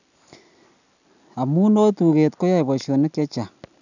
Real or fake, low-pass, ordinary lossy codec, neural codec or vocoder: real; 7.2 kHz; none; none